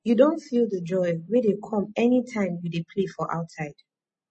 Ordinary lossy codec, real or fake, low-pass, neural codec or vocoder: MP3, 32 kbps; real; 10.8 kHz; none